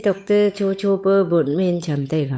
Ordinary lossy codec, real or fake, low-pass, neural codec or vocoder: none; fake; none; codec, 16 kHz, 8 kbps, FunCodec, trained on Chinese and English, 25 frames a second